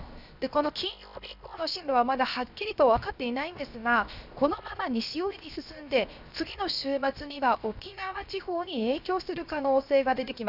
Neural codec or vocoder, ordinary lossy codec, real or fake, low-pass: codec, 16 kHz, 0.7 kbps, FocalCodec; none; fake; 5.4 kHz